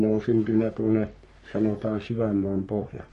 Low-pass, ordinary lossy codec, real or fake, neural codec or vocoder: 14.4 kHz; MP3, 48 kbps; fake; codec, 44.1 kHz, 3.4 kbps, Pupu-Codec